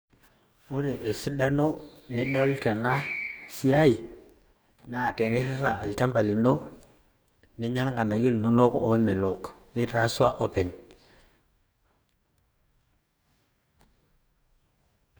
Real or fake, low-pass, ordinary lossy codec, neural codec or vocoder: fake; none; none; codec, 44.1 kHz, 2.6 kbps, DAC